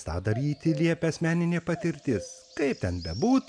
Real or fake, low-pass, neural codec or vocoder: real; 9.9 kHz; none